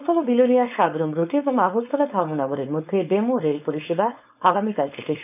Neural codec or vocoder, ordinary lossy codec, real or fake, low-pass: codec, 16 kHz, 4.8 kbps, FACodec; none; fake; 3.6 kHz